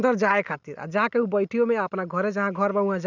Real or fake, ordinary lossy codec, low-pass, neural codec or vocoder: real; none; 7.2 kHz; none